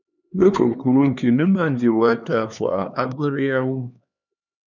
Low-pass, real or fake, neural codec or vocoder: 7.2 kHz; fake; codec, 16 kHz, 2 kbps, X-Codec, HuBERT features, trained on LibriSpeech